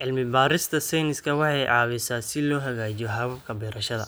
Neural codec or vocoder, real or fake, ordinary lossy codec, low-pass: none; real; none; none